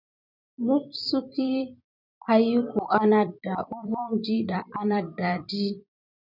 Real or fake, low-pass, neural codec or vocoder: fake; 5.4 kHz; vocoder, 44.1 kHz, 128 mel bands every 256 samples, BigVGAN v2